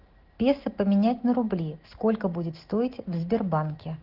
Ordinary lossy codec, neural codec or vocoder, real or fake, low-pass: Opus, 16 kbps; none; real; 5.4 kHz